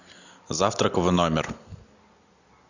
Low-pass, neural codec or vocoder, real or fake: 7.2 kHz; none; real